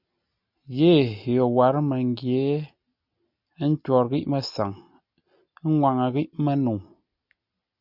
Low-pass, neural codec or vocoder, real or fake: 5.4 kHz; none; real